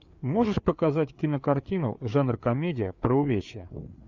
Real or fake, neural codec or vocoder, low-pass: fake; codec, 16 kHz, 4 kbps, FreqCodec, larger model; 7.2 kHz